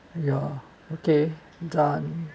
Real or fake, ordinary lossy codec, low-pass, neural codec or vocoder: real; none; none; none